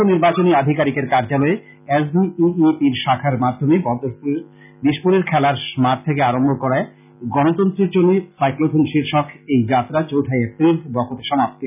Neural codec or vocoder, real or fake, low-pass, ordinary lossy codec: none; real; 3.6 kHz; none